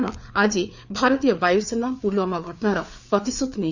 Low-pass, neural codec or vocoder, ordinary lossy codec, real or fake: 7.2 kHz; codec, 16 kHz, 4 kbps, FreqCodec, larger model; none; fake